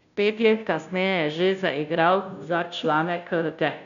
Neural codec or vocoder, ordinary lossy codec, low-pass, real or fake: codec, 16 kHz, 0.5 kbps, FunCodec, trained on Chinese and English, 25 frames a second; none; 7.2 kHz; fake